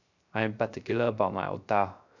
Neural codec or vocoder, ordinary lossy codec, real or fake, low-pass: codec, 16 kHz, 0.3 kbps, FocalCodec; none; fake; 7.2 kHz